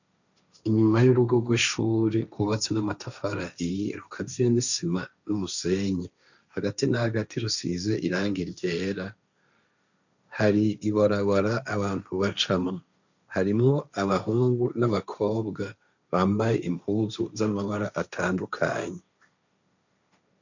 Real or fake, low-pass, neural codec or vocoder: fake; 7.2 kHz; codec, 16 kHz, 1.1 kbps, Voila-Tokenizer